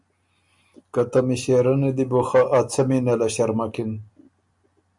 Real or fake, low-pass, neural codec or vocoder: real; 10.8 kHz; none